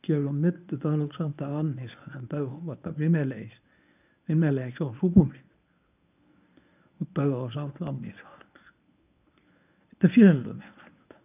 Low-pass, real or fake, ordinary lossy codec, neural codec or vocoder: 3.6 kHz; fake; none; codec, 24 kHz, 0.9 kbps, WavTokenizer, medium speech release version 1